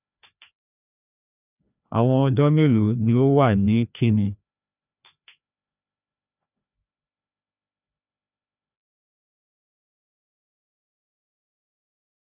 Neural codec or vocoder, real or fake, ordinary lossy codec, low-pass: codec, 16 kHz, 2 kbps, FreqCodec, larger model; fake; none; 3.6 kHz